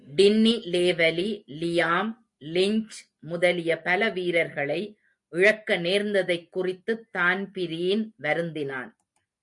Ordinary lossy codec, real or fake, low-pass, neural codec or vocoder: MP3, 64 kbps; real; 10.8 kHz; none